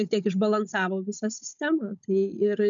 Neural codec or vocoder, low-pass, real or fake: codec, 16 kHz, 16 kbps, FunCodec, trained on Chinese and English, 50 frames a second; 7.2 kHz; fake